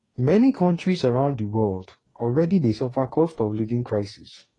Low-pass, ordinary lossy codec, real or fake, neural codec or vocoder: 10.8 kHz; AAC, 32 kbps; fake; codec, 44.1 kHz, 2.6 kbps, DAC